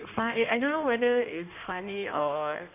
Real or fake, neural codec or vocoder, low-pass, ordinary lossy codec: fake; codec, 16 kHz in and 24 kHz out, 1.1 kbps, FireRedTTS-2 codec; 3.6 kHz; none